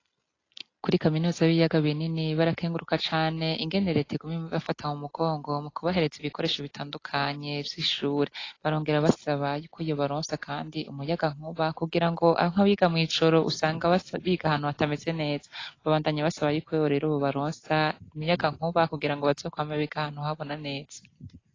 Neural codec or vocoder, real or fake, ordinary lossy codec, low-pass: none; real; AAC, 32 kbps; 7.2 kHz